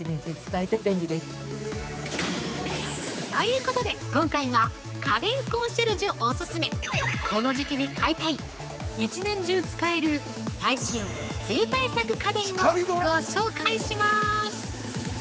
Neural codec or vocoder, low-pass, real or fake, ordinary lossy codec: codec, 16 kHz, 4 kbps, X-Codec, HuBERT features, trained on general audio; none; fake; none